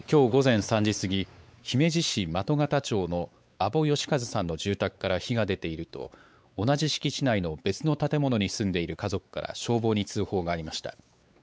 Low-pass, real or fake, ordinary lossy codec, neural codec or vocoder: none; fake; none; codec, 16 kHz, 4 kbps, X-Codec, WavLM features, trained on Multilingual LibriSpeech